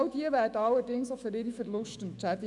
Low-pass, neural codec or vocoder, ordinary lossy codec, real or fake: 10.8 kHz; autoencoder, 48 kHz, 128 numbers a frame, DAC-VAE, trained on Japanese speech; none; fake